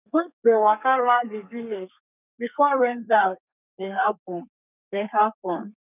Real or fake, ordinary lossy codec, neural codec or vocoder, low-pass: fake; none; codec, 32 kHz, 1.9 kbps, SNAC; 3.6 kHz